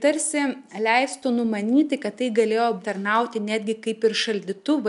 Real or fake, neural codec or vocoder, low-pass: real; none; 10.8 kHz